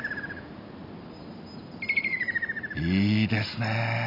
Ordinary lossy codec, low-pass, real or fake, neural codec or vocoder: none; 5.4 kHz; real; none